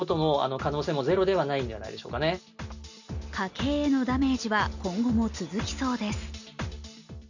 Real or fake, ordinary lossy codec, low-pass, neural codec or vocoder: real; none; 7.2 kHz; none